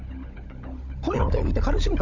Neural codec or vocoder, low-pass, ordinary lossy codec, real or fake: codec, 16 kHz, 16 kbps, FunCodec, trained on LibriTTS, 50 frames a second; 7.2 kHz; none; fake